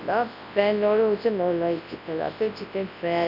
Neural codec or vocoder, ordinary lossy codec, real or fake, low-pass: codec, 24 kHz, 0.9 kbps, WavTokenizer, large speech release; none; fake; 5.4 kHz